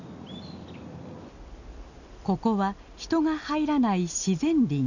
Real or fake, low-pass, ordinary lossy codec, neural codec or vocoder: real; 7.2 kHz; none; none